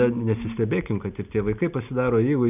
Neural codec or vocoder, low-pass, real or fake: none; 3.6 kHz; real